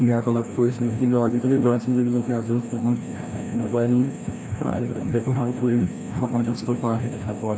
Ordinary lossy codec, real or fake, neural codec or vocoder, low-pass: none; fake; codec, 16 kHz, 1 kbps, FreqCodec, larger model; none